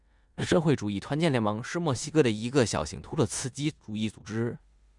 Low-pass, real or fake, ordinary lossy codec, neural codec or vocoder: 10.8 kHz; fake; Opus, 64 kbps; codec, 16 kHz in and 24 kHz out, 0.9 kbps, LongCat-Audio-Codec, four codebook decoder